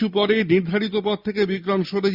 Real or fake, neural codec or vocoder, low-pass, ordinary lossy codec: real; none; 5.4 kHz; Opus, 64 kbps